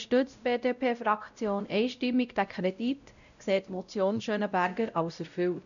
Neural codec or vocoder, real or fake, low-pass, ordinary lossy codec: codec, 16 kHz, 0.5 kbps, X-Codec, WavLM features, trained on Multilingual LibriSpeech; fake; 7.2 kHz; none